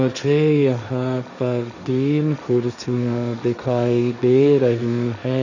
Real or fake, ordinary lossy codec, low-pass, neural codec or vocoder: fake; none; 7.2 kHz; codec, 16 kHz, 1.1 kbps, Voila-Tokenizer